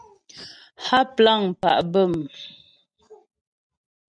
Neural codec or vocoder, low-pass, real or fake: none; 9.9 kHz; real